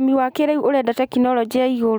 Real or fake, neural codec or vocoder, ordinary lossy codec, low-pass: real; none; none; none